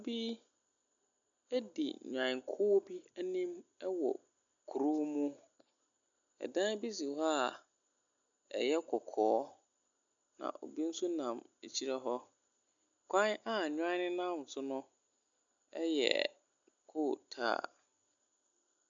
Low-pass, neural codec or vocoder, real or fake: 7.2 kHz; none; real